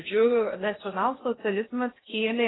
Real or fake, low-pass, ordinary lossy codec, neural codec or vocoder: fake; 7.2 kHz; AAC, 16 kbps; codec, 16 kHz in and 24 kHz out, 0.6 kbps, FocalCodec, streaming, 2048 codes